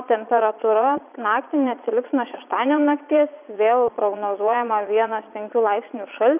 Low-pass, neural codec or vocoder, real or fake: 3.6 kHz; vocoder, 22.05 kHz, 80 mel bands, Vocos; fake